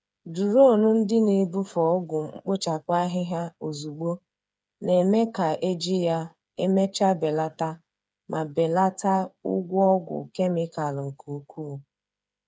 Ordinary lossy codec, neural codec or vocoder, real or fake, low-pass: none; codec, 16 kHz, 8 kbps, FreqCodec, smaller model; fake; none